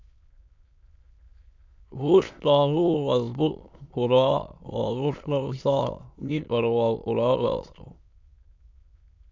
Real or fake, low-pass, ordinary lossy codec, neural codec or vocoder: fake; 7.2 kHz; MP3, 64 kbps; autoencoder, 22.05 kHz, a latent of 192 numbers a frame, VITS, trained on many speakers